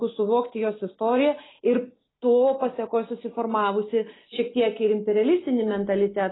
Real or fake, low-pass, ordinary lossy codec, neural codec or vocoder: real; 7.2 kHz; AAC, 16 kbps; none